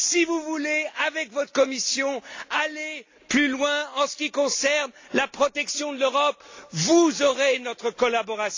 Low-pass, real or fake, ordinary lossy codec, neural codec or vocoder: 7.2 kHz; real; AAC, 48 kbps; none